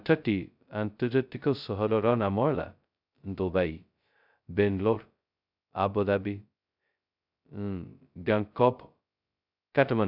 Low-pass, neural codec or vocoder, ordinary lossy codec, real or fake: 5.4 kHz; codec, 16 kHz, 0.2 kbps, FocalCodec; none; fake